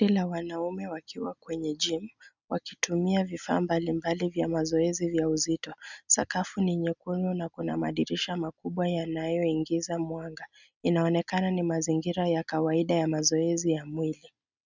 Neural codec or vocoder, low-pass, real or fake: none; 7.2 kHz; real